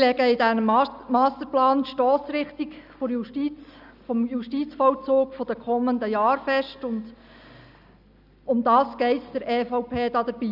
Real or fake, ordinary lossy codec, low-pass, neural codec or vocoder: real; none; 5.4 kHz; none